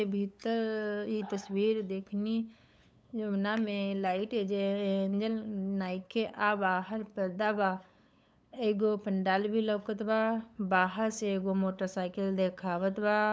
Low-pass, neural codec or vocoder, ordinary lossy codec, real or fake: none; codec, 16 kHz, 8 kbps, FunCodec, trained on LibriTTS, 25 frames a second; none; fake